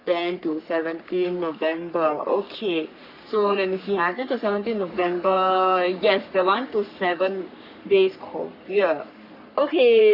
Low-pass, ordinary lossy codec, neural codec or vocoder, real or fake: 5.4 kHz; none; codec, 44.1 kHz, 3.4 kbps, Pupu-Codec; fake